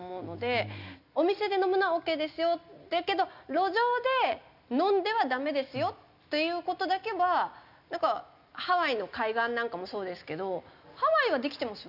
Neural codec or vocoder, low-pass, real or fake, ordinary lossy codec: none; 5.4 kHz; real; none